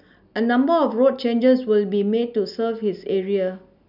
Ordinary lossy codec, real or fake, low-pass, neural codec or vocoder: none; real; 5.4 kHz; none